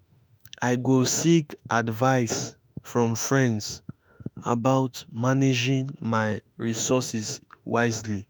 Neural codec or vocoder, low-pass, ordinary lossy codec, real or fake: autoencoder, 48 kHz, 32 numbers a frame, DAC-VAE, trained on Japanese speech; none; none; fake